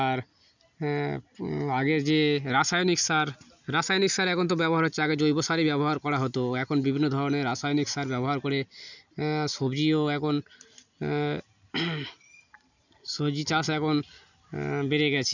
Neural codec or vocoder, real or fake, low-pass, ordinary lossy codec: none; real; 7.2 kHz; none